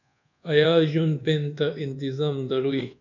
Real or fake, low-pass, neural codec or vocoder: fake; 7.2 kHz; codec, 24 kHz, 1.2 kbps, DualCodec